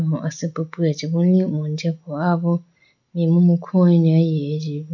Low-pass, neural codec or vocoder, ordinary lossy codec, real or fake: 7.2 kHz; none; none; real